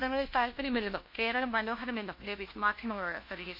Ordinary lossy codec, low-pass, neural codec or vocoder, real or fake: MP3, 32 kbps; 5.4 kHz; codec, 16 kHz, 1 kbps, FunCodec, trained on LibriTTS, 50 frames a second; fake